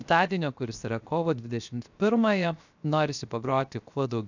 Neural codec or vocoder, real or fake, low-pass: codec, 16 kHz, 0.7 kbps, FocalCodec; fake; 7.2 kHz